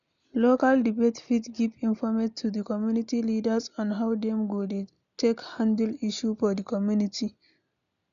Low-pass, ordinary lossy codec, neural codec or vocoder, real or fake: 7.2 kHz; none; none; real